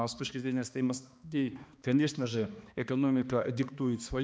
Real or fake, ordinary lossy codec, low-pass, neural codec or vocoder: fake; none; none; codec, 16 kHz, 2 kbps, X-Codec, HuBERT features, trained on balanced general audio